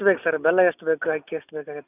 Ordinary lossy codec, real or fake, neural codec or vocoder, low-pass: none; real; none; 3.6 kHz